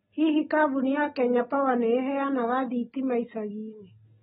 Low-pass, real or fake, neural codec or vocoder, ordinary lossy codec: 19.8 kHz; real; none; AAC, 16 kbps